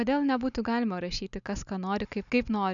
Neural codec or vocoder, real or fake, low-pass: none; real; 7.2 kHz